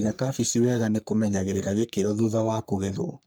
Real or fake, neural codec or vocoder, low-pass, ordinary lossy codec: fake; codec, 44.1 kHz, 3.4 kbps, Pupu-Codec; none; none